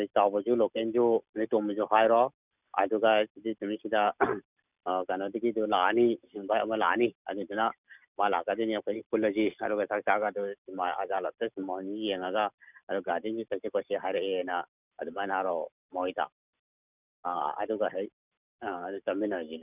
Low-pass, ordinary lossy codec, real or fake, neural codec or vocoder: 3.6 kHz; none; real; none